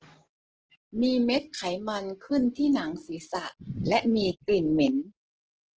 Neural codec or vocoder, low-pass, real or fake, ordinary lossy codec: none; 7.2 kHz; real; Opus, 16 kbps